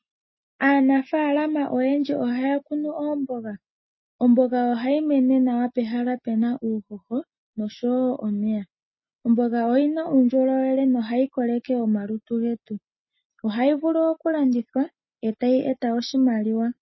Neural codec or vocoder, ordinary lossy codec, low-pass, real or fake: none; MP3, 24 kbps; 7.2 kHz; real